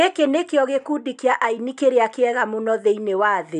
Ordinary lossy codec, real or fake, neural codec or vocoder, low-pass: none; real; none; 10.8 kHz